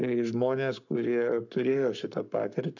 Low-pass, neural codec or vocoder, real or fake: 7.2 kHz; codec, 44.1 kHz, 7.8 kbps, Pupu-Codec; fake